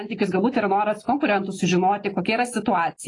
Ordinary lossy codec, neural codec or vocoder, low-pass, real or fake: AAC, 32 kbps; none; 10.8 kHz; real